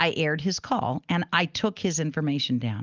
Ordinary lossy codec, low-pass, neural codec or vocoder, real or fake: Opus, 24 kbps; 7.2 kHz; none; real